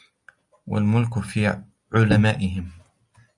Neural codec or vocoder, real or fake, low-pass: none; real; 10.8 kHz